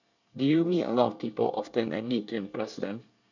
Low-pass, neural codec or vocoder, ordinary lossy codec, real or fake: 7.2 kHz; codec, 24 kHz, 1 kbps, SNAC; none; fake